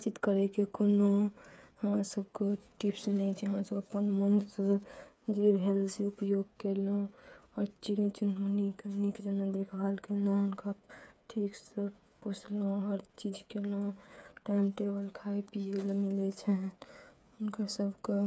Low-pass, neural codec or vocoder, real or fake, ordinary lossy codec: none; codec, 16 kHz, 8 kbps, FreqCodec, smaller model; fake; none